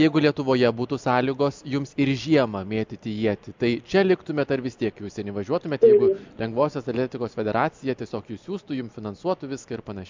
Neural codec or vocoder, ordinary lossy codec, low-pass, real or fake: none; MP3, 64 kbps; 7.2 kHz; real